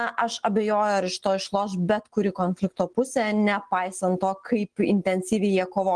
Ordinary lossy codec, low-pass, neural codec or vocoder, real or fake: Opus, 16 kbps; 9.9 kHz; none; real